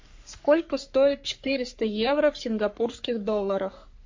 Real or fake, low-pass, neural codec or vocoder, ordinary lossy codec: fake; 7.2 kHz; codec, 44.1 kHz, 3.4 kbps, Pupu-Codec; MP3, 48 kbps